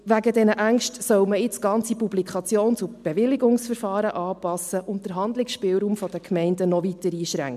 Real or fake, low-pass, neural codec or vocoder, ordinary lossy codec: real; 14.4 kHz; none; MP3, 96 kbps